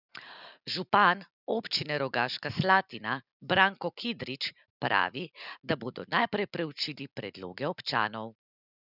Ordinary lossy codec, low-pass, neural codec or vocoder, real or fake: none; 5.4 kHz; none; real